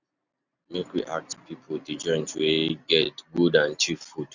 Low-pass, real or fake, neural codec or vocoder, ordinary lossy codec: 7.2 kHz; real; none; none